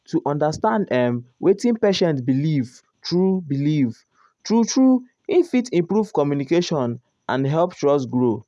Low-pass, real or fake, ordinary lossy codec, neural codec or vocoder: none; real; none; none